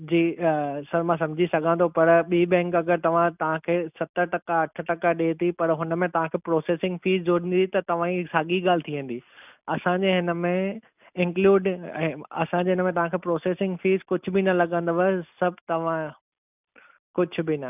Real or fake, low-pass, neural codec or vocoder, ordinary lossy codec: real; 3.6 kHz; none; none